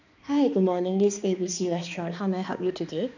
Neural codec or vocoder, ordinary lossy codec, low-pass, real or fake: codec, 16 kHz, 2 kbps, X-Codec, HuBERT features, trained on balanced general audio; none; 7.2 kHz; fake